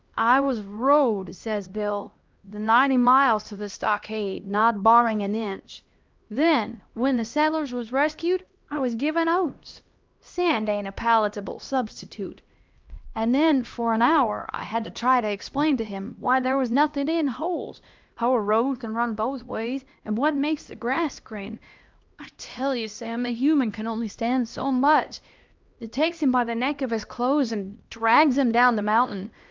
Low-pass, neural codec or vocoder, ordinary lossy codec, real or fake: 7.2 kHz; codec, 16 kHz, 1 kbps, X-Codec, HuBERT features, trained on LibriSpeech; Opus, 24 kbps; fake